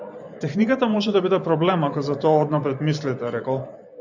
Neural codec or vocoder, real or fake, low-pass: vocoder, 44.1 kHz, 128 mel bands every 512 samples, BigVGAN v2; fake; 7.2 kHz